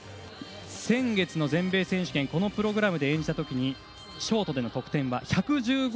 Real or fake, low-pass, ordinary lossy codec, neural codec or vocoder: real; none; none; none